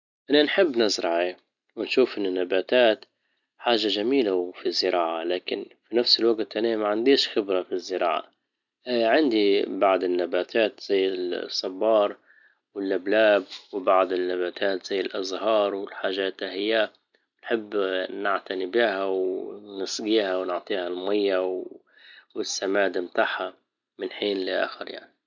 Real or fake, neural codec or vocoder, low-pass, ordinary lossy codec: real; none; 7.2 kHz; none